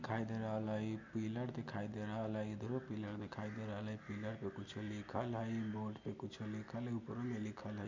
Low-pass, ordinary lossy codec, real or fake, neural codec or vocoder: 7.2 kHz; MP3, 48 kbps; real; none